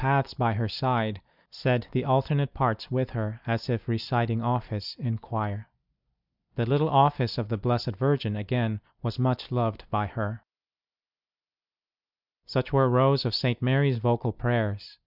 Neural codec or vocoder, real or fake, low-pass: none; real; 5.4 kHz